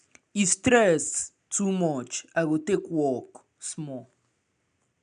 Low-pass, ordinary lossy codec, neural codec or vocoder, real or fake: 9.9 kHz; none; none; real